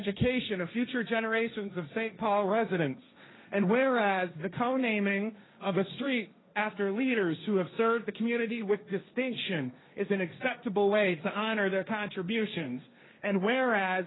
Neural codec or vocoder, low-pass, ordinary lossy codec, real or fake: codec, 16 kHz, 1.1 kbps, Voila-Tokenizer; 7.2 kHz; AAC, 16 kbps; fake